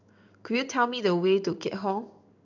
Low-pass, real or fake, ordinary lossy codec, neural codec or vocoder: 7.2 kHz; fake; none; codec, 16 kHz in and 24 kHz out, 1 kbps, XY-Tokenizer